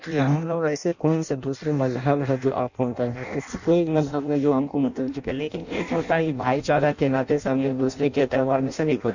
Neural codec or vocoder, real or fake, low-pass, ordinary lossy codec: codec, 16 kHz in and 24 kHz out, 0.6 kbps, FireRedTTS-2 codec; fake; 7.2 kHz; none